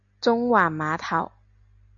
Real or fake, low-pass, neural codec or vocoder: real; 7.2 kHz; none